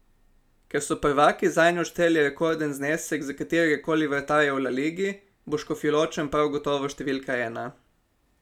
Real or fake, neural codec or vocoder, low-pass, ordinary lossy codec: real; none; 19.8 kHz; none